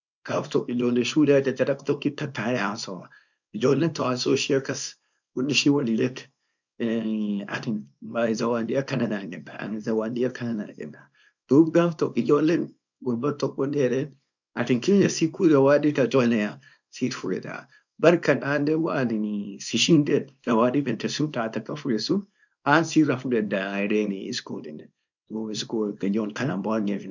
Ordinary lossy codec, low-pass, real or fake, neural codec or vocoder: none; 7.2 kHz; fake; codec, 24 kHz, 0.9 kbps, WavTokenizer, small release